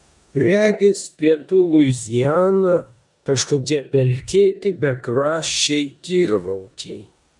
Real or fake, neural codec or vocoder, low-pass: fake; codec, 16 kHz in and 24 kHz out, 0.9 kbps, LongCat-Audio-Codec, four codebook decoder; 10.8 kHz